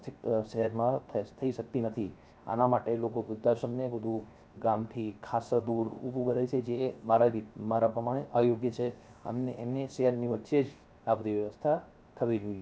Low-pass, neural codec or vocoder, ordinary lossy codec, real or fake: none; codec, 16 kHz, 0.7 kbps, FocalCodec; none; fake